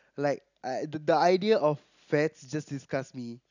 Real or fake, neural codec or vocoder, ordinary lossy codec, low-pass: real; none; none; 7.2 kHz